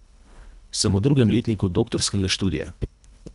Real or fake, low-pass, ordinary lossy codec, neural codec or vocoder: fake; 10.8 kHz; none; codec, 24 kHz, 1.5 kbps, HILCodec